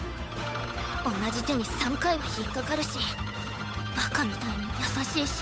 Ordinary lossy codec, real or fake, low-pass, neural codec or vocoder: none; fake; none; codec, 16 kHz, 8 kbps, FunCodec, trained on Chinese and English, 25 frames a second